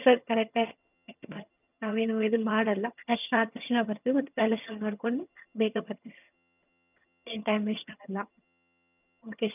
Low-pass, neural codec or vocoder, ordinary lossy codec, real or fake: 3.6 kHz; vocoder, 22.05 kHz, 80 mel bands, HiFi-GAN; none; fake